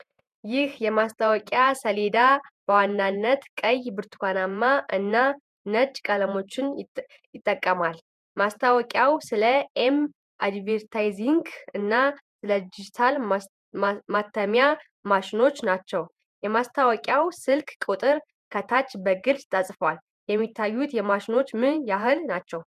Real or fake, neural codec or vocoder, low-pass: fake; vocoder, 48 kHz, 128 mel bands, Vocos; 14.4 kHz